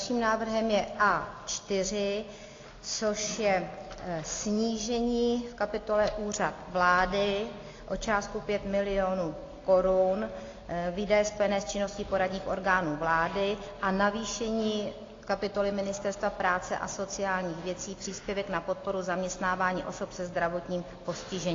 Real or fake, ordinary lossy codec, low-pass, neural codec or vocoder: real; AAC, 32 kbps; 7.2 kHz; none